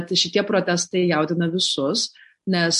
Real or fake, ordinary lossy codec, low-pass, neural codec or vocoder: real; MP3, 48 kbps; 14.4 kHz; none